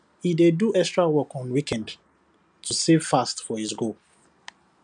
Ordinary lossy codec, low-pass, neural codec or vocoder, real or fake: none; 9.9 kHz; none; real